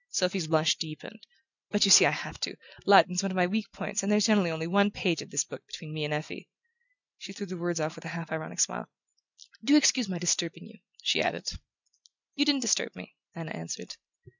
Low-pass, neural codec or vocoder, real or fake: 7.2 kHz; none; real